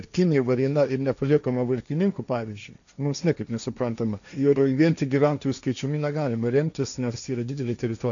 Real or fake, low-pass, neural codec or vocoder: fake; 7.2 kHz; codec, 16 kHz, 1.1 kbps, Voila-Tokenizer